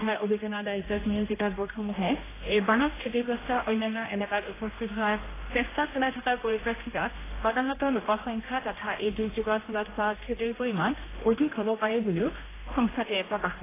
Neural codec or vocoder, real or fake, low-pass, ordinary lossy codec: codec, 16 kHz, 1 kbps, X-Codec, HuBERT features, trained on general audio; fake; 3.6 kHz; AAC, 16 kbps